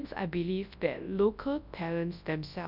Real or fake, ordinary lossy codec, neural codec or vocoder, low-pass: fake; none; codec, 24 kHz, 0.9 kbps, WavTokenizer, large speech release; 5.4 kHz